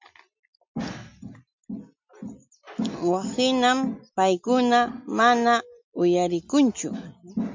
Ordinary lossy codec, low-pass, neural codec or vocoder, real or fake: MP3, 64 kbps; 7.2 kHz; none; real